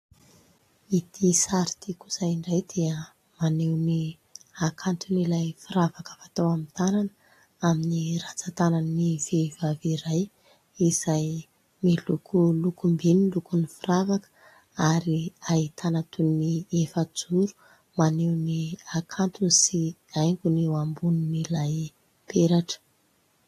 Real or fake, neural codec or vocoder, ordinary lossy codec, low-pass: real; none; AAC, 48 kbps; 14.4 kHz